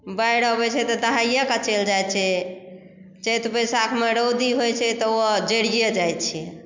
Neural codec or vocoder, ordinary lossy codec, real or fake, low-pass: none; MP3, 64 kbps; real; 7.2 kHz